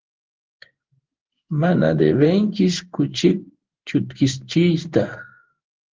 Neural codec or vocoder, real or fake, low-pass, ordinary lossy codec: codec, 16 kHz in and 24 kHz out, 1 kbps, XY-Tokenizer; fake; 7.2 kHz; Opus, 16 kbps